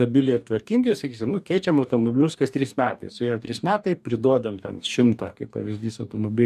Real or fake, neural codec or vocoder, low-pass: fake; codec, 44.1 kHz, 2.6 kbps, DAC; 14.4 kHz